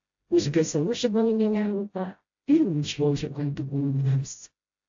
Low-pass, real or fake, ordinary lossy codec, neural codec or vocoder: 7.2 kHz; fake; AAC, 48 kbps; codec, 16 kHz, 0.5 kbps, FreqCodec, smaller model